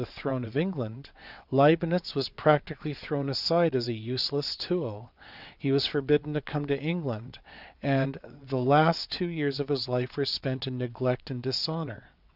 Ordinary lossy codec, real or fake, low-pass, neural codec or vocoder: Opus, 64 kbps; fake; 5.4 kHz; vocoder, 22.05 kHz, 80 mel bands, WaveNeXt